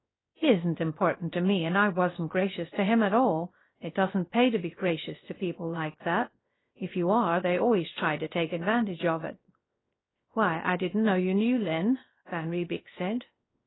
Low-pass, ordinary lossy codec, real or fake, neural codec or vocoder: 7.2 kHz; AAC, 16 kbps; fake; codec, 16 kHz, 0.3 kbps, FocalCodec